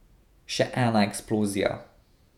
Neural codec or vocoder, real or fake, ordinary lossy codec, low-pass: vocoder, 48 kHz, 128 mel bands, Vocos; fake; none; 19.8 kHz